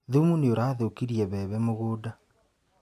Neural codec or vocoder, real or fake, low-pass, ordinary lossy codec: none; real; 14.4 kHz; none